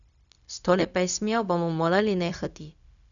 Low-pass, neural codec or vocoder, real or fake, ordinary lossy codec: 7.2 kHz; codec, 16 kHz, 0.4 kbps, LongCat-Audio-Codec; fake; none